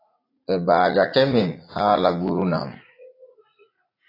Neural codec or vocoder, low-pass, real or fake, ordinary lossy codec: vocoder, 44.1 kHz, 80 mel bands, Vocos; 5.4 kHz; fake; AAC, 24 kbps